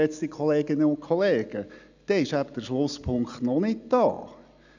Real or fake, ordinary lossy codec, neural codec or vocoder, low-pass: real; none; none; 7.2 kHz